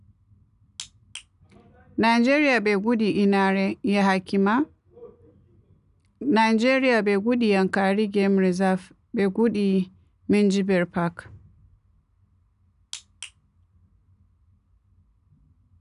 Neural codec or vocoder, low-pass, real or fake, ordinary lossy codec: none; 10.8 kHz; real; none